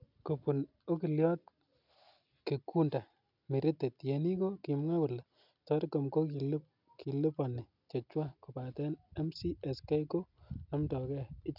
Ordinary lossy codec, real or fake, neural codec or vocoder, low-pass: none; real; none; 5.4 kHz